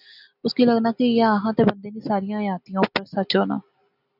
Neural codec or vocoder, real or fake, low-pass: none; real; 5.4 kHz